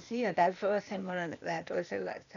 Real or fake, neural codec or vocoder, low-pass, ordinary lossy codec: fake; codec, 16 kHz, 0.8 kbps, ZipCodec; 7.2 kHz; none